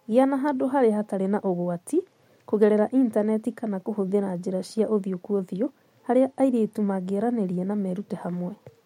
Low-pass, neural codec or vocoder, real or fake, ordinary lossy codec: 19.8 kHz; none; real; MP3, 64 kbps